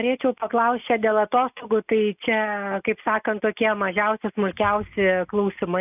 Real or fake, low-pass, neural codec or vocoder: real; 3.6 kHz; none